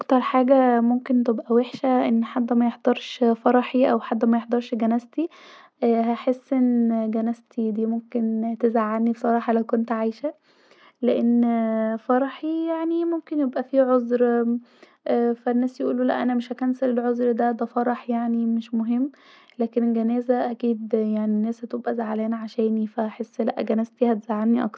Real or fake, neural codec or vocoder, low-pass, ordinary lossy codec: real; none; none; none